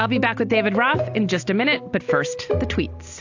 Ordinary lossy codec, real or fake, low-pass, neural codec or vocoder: AAC, 48 kbps; real; 7.2 kHz; none